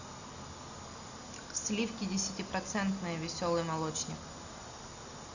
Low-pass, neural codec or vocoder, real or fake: 7.2 kHz; none; real